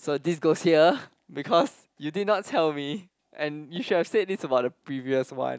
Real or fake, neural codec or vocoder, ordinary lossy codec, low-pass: real; none; none; none